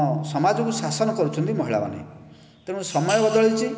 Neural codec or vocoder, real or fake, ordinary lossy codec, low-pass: none; real; none; none